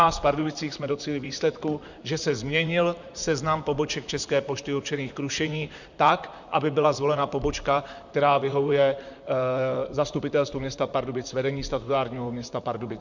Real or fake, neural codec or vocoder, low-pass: fake; vocoder, 44.1 kHz, 128 mel bands, Pupu-Vocoder; 7.2 kHz